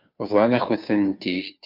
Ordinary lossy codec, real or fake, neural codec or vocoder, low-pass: MP3, 48 kbps; fake; codec, 16 kHz, 2 kbps, FunCodec, trained on Chinese and English, 25 frames a second; 5.4 kHz